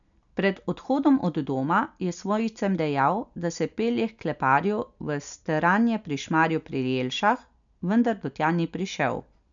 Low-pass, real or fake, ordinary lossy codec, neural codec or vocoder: 7.2 kHz; real; none; none